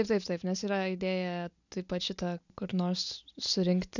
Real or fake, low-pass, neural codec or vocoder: real; 7.2 kHz; none